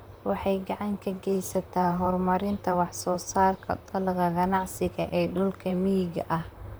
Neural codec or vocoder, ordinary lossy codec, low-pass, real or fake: vocoder, 44.1 kHz, 128 mel bands, Pupu-Vocoder; none; none; fake